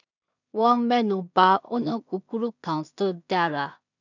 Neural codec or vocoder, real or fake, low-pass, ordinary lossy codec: codec, 16 kHz in and 24 kHz out, 0.4 kbps, LongCat-Audio-Codec, two codebook decoder; fake; 7.2 kHz; none